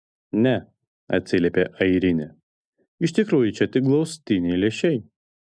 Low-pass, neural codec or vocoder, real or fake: 9.9 kHz; none; real